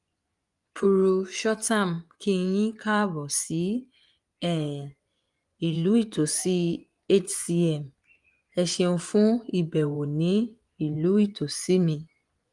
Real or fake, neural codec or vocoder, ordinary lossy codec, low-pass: fake; vocoder, 24 kHz, 100 mel bands, Vocos; Opus, 32 kbps; 10.8 kHz